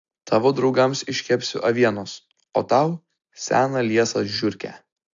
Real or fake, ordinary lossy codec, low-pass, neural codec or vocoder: real; AAC, 64 kbps; 7.2 kHz; none